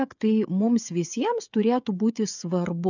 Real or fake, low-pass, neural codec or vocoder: fake; 7.2 kHz; codec, 16 kHz, 16 kbps, FreqCodec, smaller model